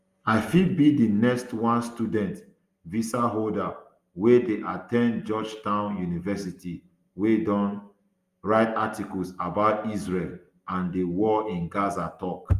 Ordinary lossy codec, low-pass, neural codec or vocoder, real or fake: Opus, 32 kbps; 14.4 kHz; none; real